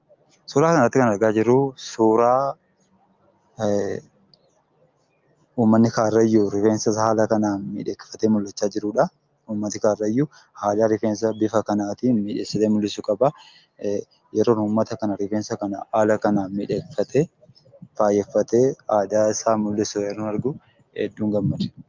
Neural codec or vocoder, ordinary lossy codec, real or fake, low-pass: none; Opus, 24 kbps; real; 7.2 kHz